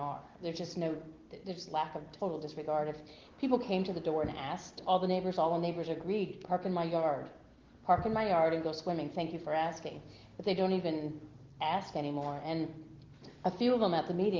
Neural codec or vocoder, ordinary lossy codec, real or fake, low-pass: none; Opus, 16 kbps; real; 7.2 kHz